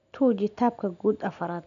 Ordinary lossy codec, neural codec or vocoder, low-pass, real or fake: none; none; 7.2 kHz; real